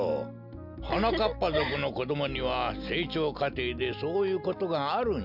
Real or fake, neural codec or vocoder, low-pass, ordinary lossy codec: real; none; 5.4 kHz; none